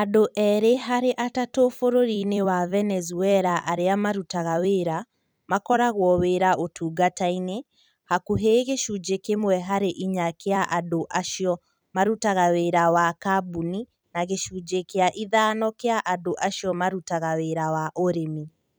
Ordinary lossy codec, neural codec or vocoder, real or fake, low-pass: none; vocoder, 44.1 kHz, 128 mel bands every 256 samples, BigVGAN v2; fake; none